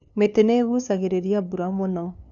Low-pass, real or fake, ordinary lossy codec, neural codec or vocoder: 7.2 kHz; fake; none; codec, 16 kHz, 2 kbps, FunCodec, trained on LibriTTS, 25 frames a second